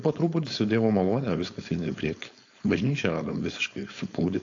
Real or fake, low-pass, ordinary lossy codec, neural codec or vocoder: fake; 7.2 kHz; AAC, 48 kbps; codec, 16 kHz, 4.8 kbps, FACodec